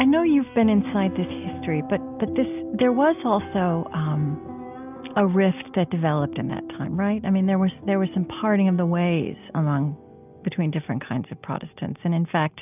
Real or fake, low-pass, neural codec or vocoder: real; 3.6 kHz; none